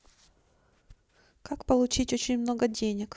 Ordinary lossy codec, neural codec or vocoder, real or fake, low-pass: none; none; real; none